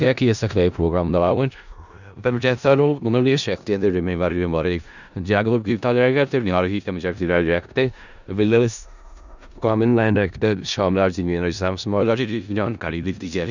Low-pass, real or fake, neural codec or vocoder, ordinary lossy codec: 7.2 kHz; fake; codec, 16 kHz in and 24 kHz out, 0.4 kbps, LongCat-Audio-Codec, four codebook decoder; none